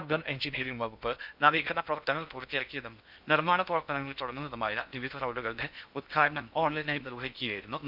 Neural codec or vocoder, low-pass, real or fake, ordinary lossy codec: codec, 16 kHz in and 24 kHz out, 0.6 kbps, FocalCodec, streaming, 2048 codes; 5.4 kHz; fake; none